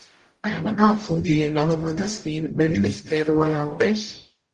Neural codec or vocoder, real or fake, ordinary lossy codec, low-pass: codec, 44.1 kHz, 0.9 kbps, DAC; fake; Opus, 24 kbps; 10.8 kHz